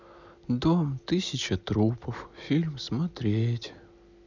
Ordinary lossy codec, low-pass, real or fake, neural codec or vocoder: none; 7.2 kHz; real; none